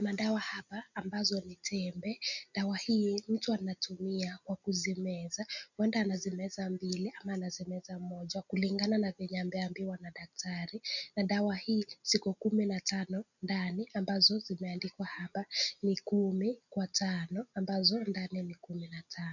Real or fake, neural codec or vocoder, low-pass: real; none; 7.2 kHz